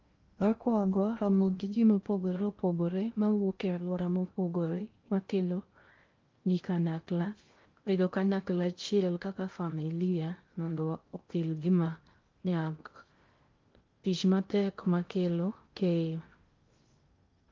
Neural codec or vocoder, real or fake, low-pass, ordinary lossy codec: codec, 16 kHz in and 24 kHz out, 0.6 kbps, FocalCodec, streaming, 2048 codes; fake; 7.2 kHz; Opus, 32 kbps